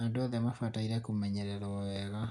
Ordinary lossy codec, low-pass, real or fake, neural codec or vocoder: none; 10.8 kHz; real; none